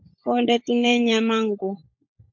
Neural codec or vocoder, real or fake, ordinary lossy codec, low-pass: codec, 16 kHz, 16 kbps, FunCodec, trained on LibriTTS, 50 frames a second; fake; MP3, 48 kbps; 7.2 kHz